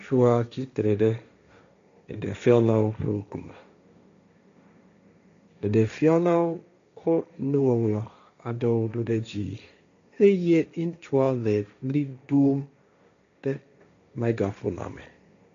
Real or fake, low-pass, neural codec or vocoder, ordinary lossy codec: fake; 7.2 kHz; codec, 16 kHz, 1.1 kbps, Voila-Tokenizer; AAC, 64 kbps